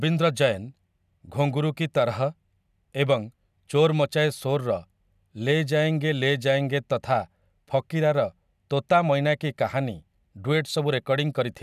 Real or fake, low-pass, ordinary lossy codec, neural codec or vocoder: real; 14.4 kHz; none; none